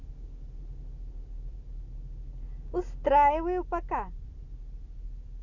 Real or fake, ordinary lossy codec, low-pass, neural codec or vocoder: real; none; 7.2 kHz; none